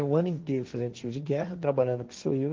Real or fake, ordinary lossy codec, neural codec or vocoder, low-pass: fake; Opus, 32 kbps; codec, 16 kHz, 1.1 kbps, Voila-Tokenizer; 7.2 kHz